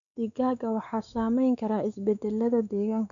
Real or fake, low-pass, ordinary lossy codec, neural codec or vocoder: fake; 7.2 kHz; none; codec, 16 kHz, 4 kbps, X-Codec, WavLM features, trained on Multilingual LibriSpeech